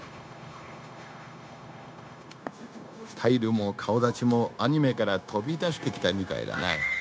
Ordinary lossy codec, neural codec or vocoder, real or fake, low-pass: none; codec, 16 kHz, 0.9 kbps, LongCat-Audio-Codec; fake; none